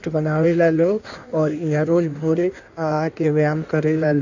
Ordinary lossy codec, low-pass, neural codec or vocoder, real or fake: none; 7.2 kHz; codec, 16 kHz in and 24 kHz out, 1.1 kbps, FireRedTTS-2 codec; fake